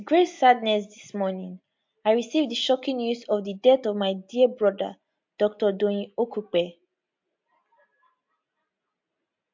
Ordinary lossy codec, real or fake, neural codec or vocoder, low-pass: MP3, 48 kbps; real; none; 7.2 kHz